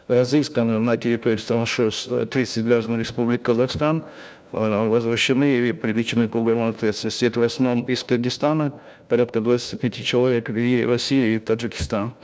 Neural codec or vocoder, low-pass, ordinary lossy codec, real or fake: codec, 16 kHz, 1 kbps, FunCodec, trained on LibriTTS, 50 frames a second; none; none; fake